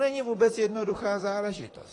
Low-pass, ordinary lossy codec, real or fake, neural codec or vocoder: 10.8 kHz; AAC, 32 kbps; fake; vocoder, 44.1 kHz, 128 mel bands, Pupu-Vocoder